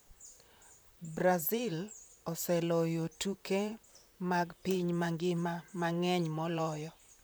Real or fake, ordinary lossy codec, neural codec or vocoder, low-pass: fake; none; vocoder, 44.1 kHz, 128 mel bands, Pupu-Vocoder; none